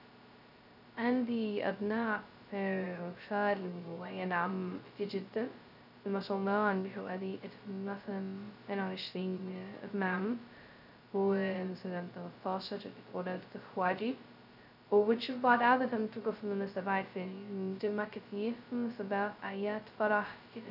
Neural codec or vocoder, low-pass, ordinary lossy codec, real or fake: codec, 16 kHz, 0.2 kbps, FocalCodec; 5.4 kHz; none; fake